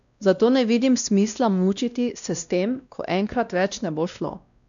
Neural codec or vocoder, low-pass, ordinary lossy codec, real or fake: codec, 16 kHz, 1 kbps, X-Codec, WavLM features, trained on Multilingual LibriSpeech; 7.2 kHz; none; fake